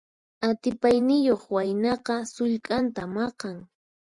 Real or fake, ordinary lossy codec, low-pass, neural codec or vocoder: fake; Opus, 64 kbps; 10.8 kHz; vocoder, 44.1 kHz, 128 mel bands every 512 samples, BigVGAN v2